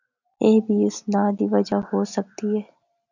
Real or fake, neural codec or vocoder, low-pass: real; none; 7.2 kHz